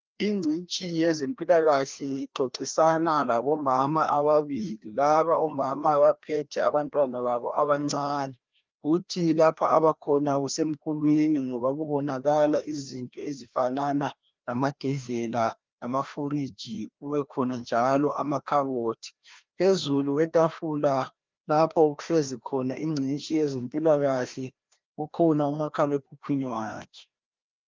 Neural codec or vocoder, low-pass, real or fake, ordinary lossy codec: codec, 16 kHz, 1 kbps, FreqCodec, larger model; 7.2 kHz; fake; Opus, 32 kbps